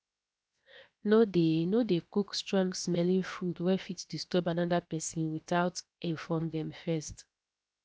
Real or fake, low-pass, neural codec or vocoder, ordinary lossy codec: fake; none; codec, 16 kHz, 0.7 kbps, FocalCodec; none